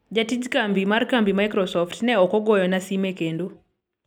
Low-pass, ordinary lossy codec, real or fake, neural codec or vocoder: 19.8 kHz; none; real; none